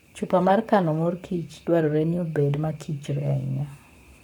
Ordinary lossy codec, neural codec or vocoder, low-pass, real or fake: none; codec, 44.1 kHz, 7.8 kbps, Pupu-Codec; 19.8 kHz; fake